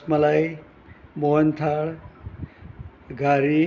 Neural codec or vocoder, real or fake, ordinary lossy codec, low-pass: none; real; Opus, 64 kbps; 7.2 kHz